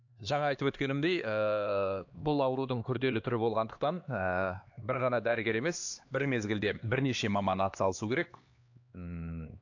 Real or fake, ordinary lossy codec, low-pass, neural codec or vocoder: fake; AAC, 48 kbps; 7.2 kHz; codec, 16 kHz, 2 kbps, X-Codec, HuBERT features, trained on LibriSpeech